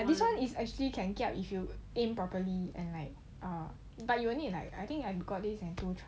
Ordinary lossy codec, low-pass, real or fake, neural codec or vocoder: none; none; real; none